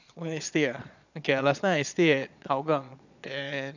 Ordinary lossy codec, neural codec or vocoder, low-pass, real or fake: none; vocoder, 22.05 kHz, 80 mel bands, Vocos; 7.2 kHz; fake